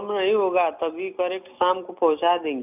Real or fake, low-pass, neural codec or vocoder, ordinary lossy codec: real; 3.6 kHz; none; none